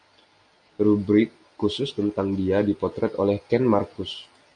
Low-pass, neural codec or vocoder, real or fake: 9.9 kHz; none; real